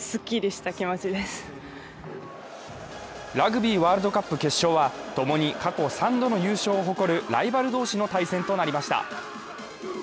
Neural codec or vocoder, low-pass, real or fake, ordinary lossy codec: none; none; real; none